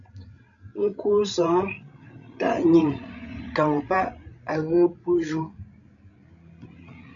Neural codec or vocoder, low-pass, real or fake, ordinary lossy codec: codec, 16 kHz, 16 kbps, FreqCodec, larger model; 7.2 kHz; fake; MP3, 96 kbps